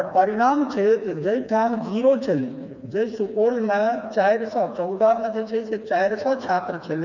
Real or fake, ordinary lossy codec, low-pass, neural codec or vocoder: fake; none; 7.2 kHz; codec, 16 kHz, 2 kbps, FreqCodec, smaller model